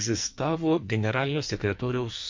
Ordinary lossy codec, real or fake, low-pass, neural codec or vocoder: MP3, 48 kbps; fake; 7.2 kHz; codec, 44.1 kHz, 2.6 kbps, SNAC